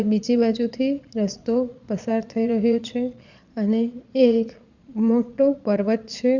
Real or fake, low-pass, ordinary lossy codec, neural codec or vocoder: fake; 7.2 kHz; Opus, 64 kbps; vocoder, 22.05 kHz, 80 mel bands, Vocos